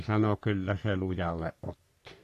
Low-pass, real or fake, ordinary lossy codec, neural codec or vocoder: 14.4 kHz; fake; AAC, 64 kbps; codec, 44.1 kHz, 3.4 kbps, Pupu-Codec